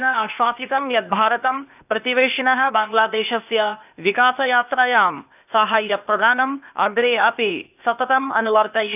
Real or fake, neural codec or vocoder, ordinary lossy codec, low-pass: fake; codec, 16 kHz, 0.8 kbps, ZipCodec; none; 3.6 kHz